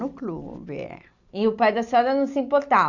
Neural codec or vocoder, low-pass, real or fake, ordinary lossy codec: none; 7.2 kHz; real; none